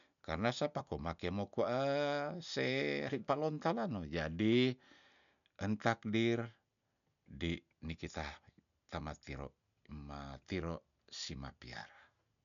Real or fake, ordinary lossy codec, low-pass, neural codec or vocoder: real; none; 7.2 kHz; none